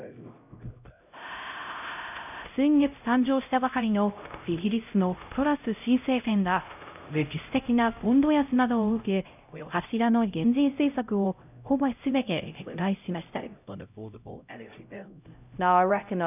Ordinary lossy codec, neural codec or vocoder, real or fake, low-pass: none; codec, 16 kHz, 0.5 kbps, X-Codec, HuBERT features, trained on LibriSpeech; fake; 3.6 kHz